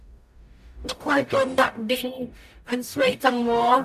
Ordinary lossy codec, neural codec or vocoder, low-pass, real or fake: MP3, 96 kbps; codec, 44.1 kHz, 0.9 kbps, DAC; 14.4 kHz; fake